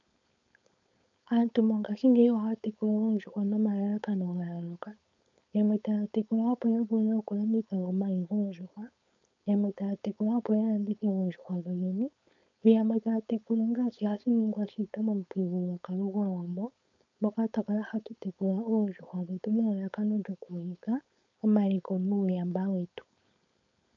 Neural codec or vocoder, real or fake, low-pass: codec, 16 kHz, 4.8 kbps, FACodec; fake; 7.2 kHz